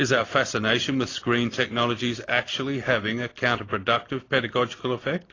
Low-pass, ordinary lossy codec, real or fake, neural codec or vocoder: 7.2 kHz; AAC, 32 kbps; real; none